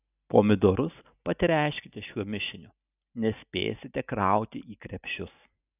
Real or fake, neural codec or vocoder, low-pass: real; none; 3.6 kHz